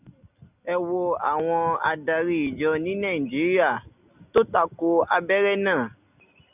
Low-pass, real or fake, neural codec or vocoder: 3.6 kHz; real; none